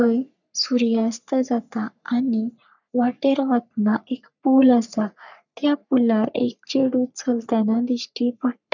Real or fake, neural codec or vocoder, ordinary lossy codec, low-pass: fake; codec, 44.1 kHz, 3.4 kbps, Pupu-Codec; none; 7.2 kHz